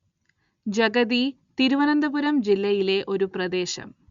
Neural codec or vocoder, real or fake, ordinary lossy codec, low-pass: none; real; Opus, 64 kbps; 7.2 kHz